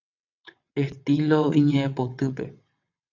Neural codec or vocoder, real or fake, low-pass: vocoder, 22.05 kHz, 80 mel bands, WaveNeXt; fake; 7.2 kHz